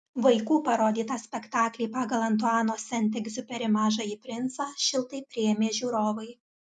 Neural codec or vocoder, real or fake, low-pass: none; real; 10.8 kHz